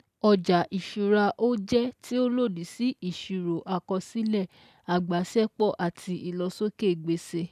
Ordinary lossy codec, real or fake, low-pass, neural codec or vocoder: none; real; 14.4 kHz; none